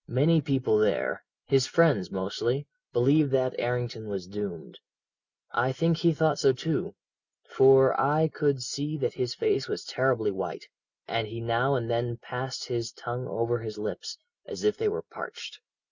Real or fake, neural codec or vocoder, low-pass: real; none; 7.2 kHz